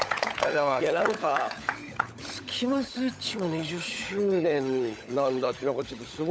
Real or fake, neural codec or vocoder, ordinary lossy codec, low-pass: fake; codec, 16 kHz, 16 kbps, FunCodec, trained on LibriTTS, 50 frames a second; none; none